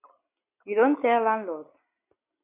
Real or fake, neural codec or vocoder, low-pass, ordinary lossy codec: real; none; 3.6 kHz; AAC, 16 kbps